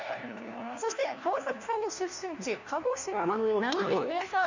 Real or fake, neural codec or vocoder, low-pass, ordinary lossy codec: fake; codec, 16 kHz, 1 kbps, FunCodec, trained on LibriTTS, 50 frames a second; 7.2 kHz; none